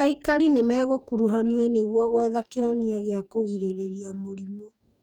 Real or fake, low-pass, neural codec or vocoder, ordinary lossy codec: fake; 19.8 kHz; codec, 44.1 kHz, 2.6 kbps, DAC; none